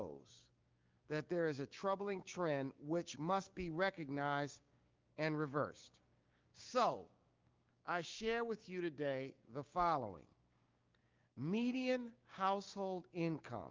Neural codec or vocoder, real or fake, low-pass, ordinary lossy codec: codec, 16 kHz, 6 kbps, DAC; fake; 7.2 kHz; Opus, 24 kbps